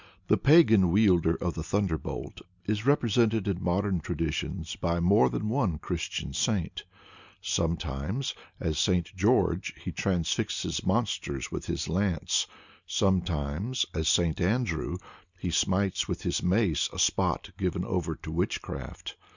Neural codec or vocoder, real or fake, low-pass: none; real; 7.2 kHz